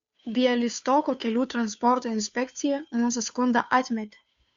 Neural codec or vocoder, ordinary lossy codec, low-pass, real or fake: codec, 16 kHz, 2 kbps, FunCodec, trained on Chinese and English, 25 frames a second; Opus, 64 kbps; 7.2 kHz; fake